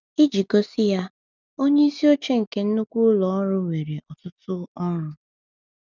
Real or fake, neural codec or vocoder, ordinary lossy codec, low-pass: real; none; none; 7.2 kHz